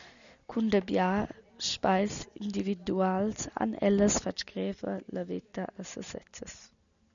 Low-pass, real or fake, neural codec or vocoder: 7.2 kHz; real; none